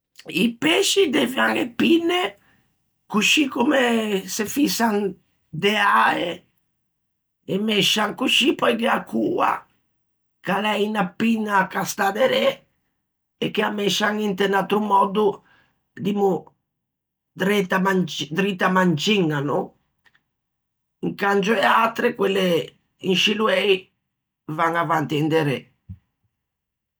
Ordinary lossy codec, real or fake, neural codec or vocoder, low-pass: none; real; none; none